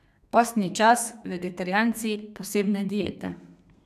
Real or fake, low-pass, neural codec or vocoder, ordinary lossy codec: fake; 14.4 kHz; codec, 32 kHz, 1.9 kbps, SNAC; none